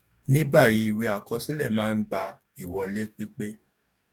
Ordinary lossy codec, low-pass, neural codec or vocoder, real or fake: none; 19.8 kHz; codec, 44.1 kHz, 2.6 kbps, DAC; fake